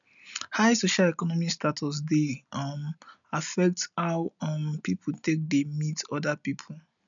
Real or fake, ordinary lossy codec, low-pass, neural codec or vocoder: real; none; 7.2 kHz; none